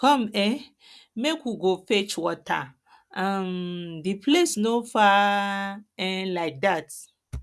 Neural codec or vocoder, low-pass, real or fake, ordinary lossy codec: none; none; real; none